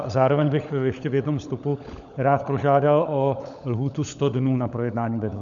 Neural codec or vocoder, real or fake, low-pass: codec, 16 kHz, 16 kbps, FunCodec, trained on LibriTTS, 50 frames a second; fake; 7.2 kHz